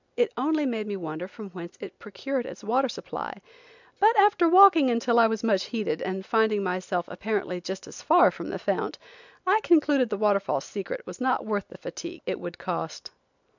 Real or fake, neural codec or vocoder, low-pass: real; none; 7.2 kHz